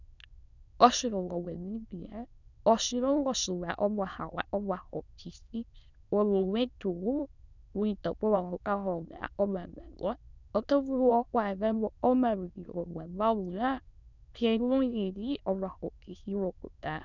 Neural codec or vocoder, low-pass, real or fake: autoencoder, 22.05 kHz, a latent of 192 numbers a frame, VITS, trained on many speakers; 7.2 kHz; fake